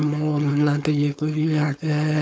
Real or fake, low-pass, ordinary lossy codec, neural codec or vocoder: fake; none; none; codec, 16 kHz, 4.8 kbps, FACodec